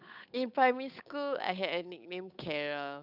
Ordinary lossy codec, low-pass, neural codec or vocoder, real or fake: none; 5.4 kHz; codec, 16 kHz, 8 kbps, FunCodec, trained on Chinese and English, 25 frames a second; fake